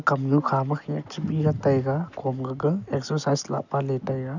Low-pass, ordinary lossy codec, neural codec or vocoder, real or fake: 7.2 kHz; none; none; real